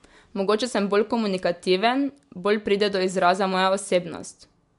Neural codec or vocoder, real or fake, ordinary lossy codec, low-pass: none; real; MP3, 64 kbps; 10.8 kHz